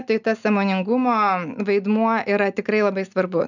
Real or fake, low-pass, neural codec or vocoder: real; 7.2 kHz; none